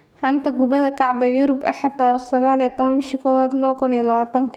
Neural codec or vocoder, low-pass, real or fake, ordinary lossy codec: codec, 44.1 kHz, 2.6 kbps, DAC; 19.8 kHz; fake; none